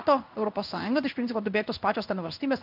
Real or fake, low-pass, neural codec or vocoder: fake; 5.4 kHz; codec, 16 kHz in and 24 kHz out, 1 kbps, XY-Tokenizer